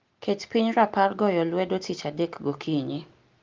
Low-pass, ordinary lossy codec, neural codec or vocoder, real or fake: 7.2 kHz; Opus, 32 kbps; none; real